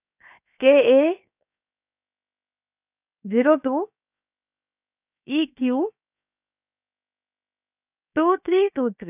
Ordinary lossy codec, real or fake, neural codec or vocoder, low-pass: none; fake; codec, 16 kHz, 0.7 kbps, FocalCodec; 3.6 kHz